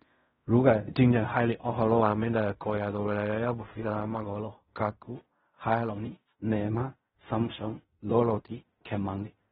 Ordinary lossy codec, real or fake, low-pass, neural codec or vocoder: AAC, 16 kbps; fake; 10.8 kHz; codec, 16 kHz in and 24 kHz out, 0.4 kbps, LongCat-Audio-Codec, fine tuned four codebook decoder